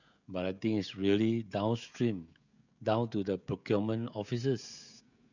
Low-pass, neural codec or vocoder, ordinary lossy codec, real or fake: 7.2 kHz; codec, 16 kHz, 16 kbps, FreqCodec, smaller model; none; fake